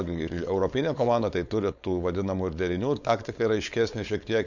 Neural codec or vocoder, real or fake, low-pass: codec, 16 kHz, 4.8 kbps, FACodec; fake; 7.2 kHz